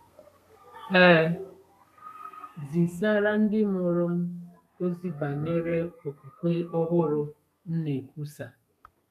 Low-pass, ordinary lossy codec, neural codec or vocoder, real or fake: 14.4 kHz; MP3, 96 kbps; codec, 32 kHz, 1.9 kbps, SNAC; fake